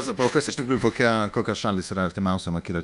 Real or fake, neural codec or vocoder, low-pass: fake; codec, 24 kHz, 1.2 kbps, DualCodec; 10.8 kHz